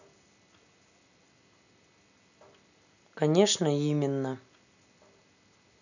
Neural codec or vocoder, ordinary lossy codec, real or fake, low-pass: none; none; real; 7.2 kHz